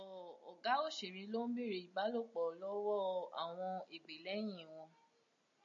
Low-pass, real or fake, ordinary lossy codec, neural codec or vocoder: 7.2 kHz; real; AAC, 64 kbps; none